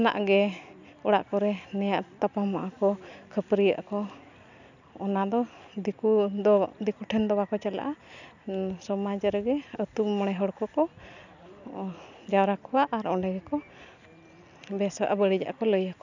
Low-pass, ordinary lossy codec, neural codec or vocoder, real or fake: 7.2 kHz; none; none; real